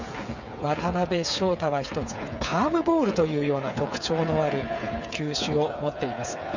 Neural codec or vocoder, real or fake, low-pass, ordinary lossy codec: codec, 16 kHz, 8 kbps, FreqCodec, smaller model; fake; 7.2 kHz; none